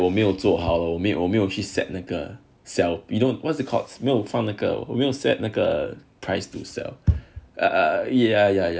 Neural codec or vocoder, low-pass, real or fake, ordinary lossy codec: none; none; real; none